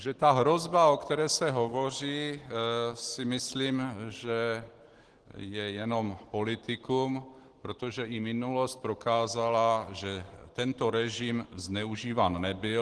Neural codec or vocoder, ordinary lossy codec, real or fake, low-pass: none; Opus, 16 kbps; real; 10.8 kHz